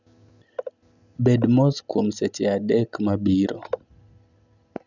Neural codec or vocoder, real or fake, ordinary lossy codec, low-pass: none; real; none; 7.2 kHz